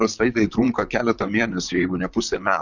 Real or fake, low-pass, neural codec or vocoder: fake; 7.2 kHz; vocoder, 44.1 kHz, 128 mel bands, Pupu-Vocoder